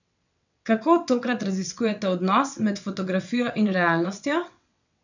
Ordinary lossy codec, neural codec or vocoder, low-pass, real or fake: none; vocoder, 22.05 kHz, 80 mel bands, WaveNeXt; 7.2 kHz; fake